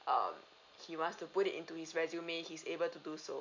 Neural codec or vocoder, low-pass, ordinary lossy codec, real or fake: none; 7.2 kHz; MP3, 64 kbps; real